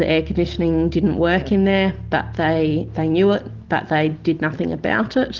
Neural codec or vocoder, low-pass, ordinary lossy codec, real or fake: none; 7.2 kHz; Opus, 16 kbps; real